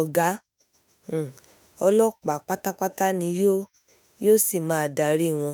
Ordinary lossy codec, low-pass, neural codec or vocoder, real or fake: none; none; autoencoder, 48 kHz, 32 numbers a frame, DAC-VAE, trained on Japanese speech; fake